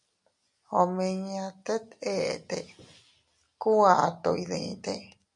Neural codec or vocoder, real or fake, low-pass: none; real; 10.8 kHz